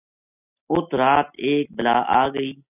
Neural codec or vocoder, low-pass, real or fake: none; 3.6 kHz; real